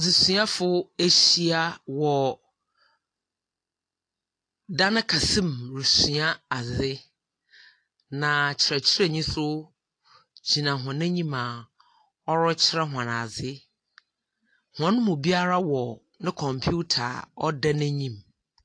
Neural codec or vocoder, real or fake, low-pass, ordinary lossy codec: none; real; 9.9 kHz; AAC, 48 kbps